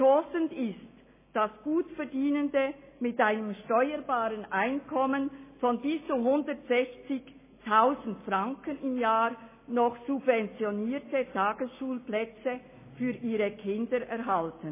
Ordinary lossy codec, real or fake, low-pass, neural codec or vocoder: MP3, 16 kbps; real; 3.6 kHz; none